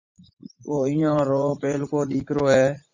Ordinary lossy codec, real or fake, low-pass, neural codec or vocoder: Opus, 64 kbps; fake; 7.2 kHz; vocoder, 44.1 kHz, 80 mel bands, Vocos